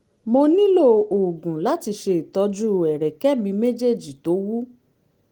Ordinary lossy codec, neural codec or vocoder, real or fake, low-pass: Opus, 24 kbps; none; real; 19.8 kHz